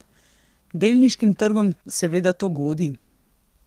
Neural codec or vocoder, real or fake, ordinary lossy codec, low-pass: codec, 32 kHz, 1.9 kbps, SNAC; fake; Opus, 24 kbps; 14.4 kHz